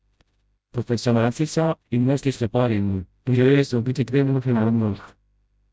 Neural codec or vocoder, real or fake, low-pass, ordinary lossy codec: codec, 16 kHz, 0.5 kbps, FreqCodec, smaller model; fake; none; none